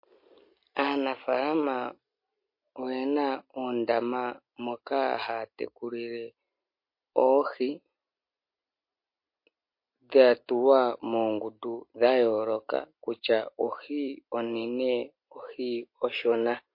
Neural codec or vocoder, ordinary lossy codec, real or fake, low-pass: none; MP3, 32 kbps; real; 5.4 kHz